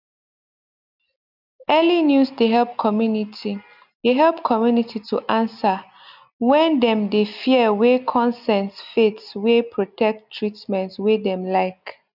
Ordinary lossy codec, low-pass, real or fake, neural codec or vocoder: none; 5.4 kHz; real; none